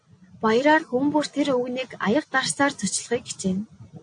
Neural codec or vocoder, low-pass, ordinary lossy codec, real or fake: none; 9.9 kHz; AAC, 48 kbps; real